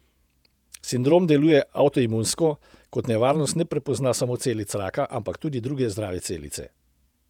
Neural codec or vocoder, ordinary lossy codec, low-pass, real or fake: vocoder, 48 kHz, 128 mel bands, Vocos; none; 19.8 kHz; fake